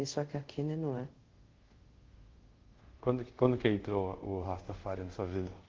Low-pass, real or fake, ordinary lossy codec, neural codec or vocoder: 7.2 kHz; fake; Opus, 16 kbps; codec, 24 kHz, 0.5 kbps, DualCodec